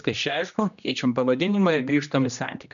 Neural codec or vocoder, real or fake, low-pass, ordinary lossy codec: codec, 16 kHz, 1 kbps, X-Codec, HuBERT features, trained on general audio; fake; 7.2 kHz; MP3, 96 kbps